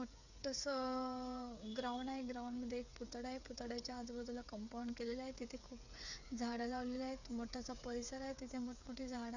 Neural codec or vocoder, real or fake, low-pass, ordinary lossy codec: codec, 16 kHz, 8 kbps, FreqCodec, smaller model; fake; 7.2 kHz; none